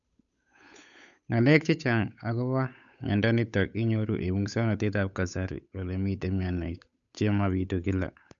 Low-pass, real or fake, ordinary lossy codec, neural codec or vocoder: 7.2 kHz; fake; none; codec, 16 kHz, 8 kbps, FunCodec, trained on Chinese and English, 25 frames a second